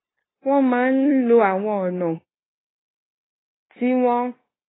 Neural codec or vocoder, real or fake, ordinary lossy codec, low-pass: codec, 16 kHz, 0.9 kbps, LongCat-Audio-Codec; fake; AAC, 16 kbps; 7.2 kHz